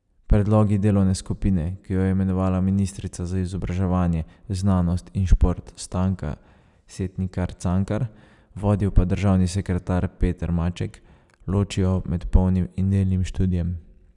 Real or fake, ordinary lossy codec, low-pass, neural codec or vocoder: real; none; 10.8 kHz; none